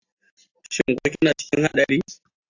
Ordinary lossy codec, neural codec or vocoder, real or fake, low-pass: AAC, 48 kbps; none; real; 7.2 kHz